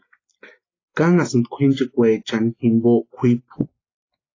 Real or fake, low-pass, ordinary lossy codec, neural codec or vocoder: real; 7.2 kHz; AAC, 32 kbps; none